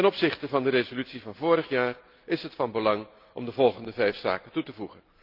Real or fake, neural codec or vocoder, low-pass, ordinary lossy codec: real; none; 5.4 kHz; Opus, 32 kbps